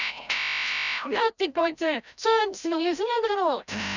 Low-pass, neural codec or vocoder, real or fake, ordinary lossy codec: 7.2 kHz; codec, 16 kHz, 0.5 kbps, FreqCodec, larger model; fake; none